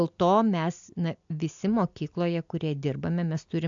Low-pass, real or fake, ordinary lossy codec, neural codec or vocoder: 7.2 kHz; real; AAC, 64 kbps; none